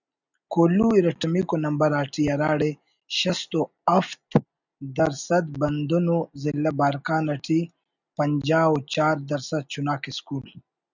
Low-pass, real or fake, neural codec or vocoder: 7.2 kHz; real; none